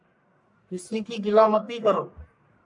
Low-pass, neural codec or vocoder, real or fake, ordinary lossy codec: 10.8 kHz; codec, 44.1 kHz, 1.7 kbps, Pupu-Codec; fake; AAC, 64 kbps